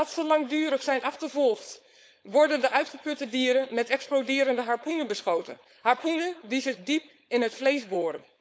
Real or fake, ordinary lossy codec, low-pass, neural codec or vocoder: fake; none; none; codec, 16 kHz, 4.8 kbps, FACodec